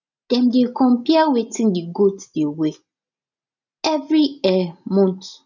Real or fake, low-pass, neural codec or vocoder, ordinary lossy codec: real; 7.2 kHz; none; none